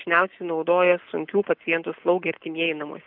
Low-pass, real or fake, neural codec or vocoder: 5.4 kHz; real; none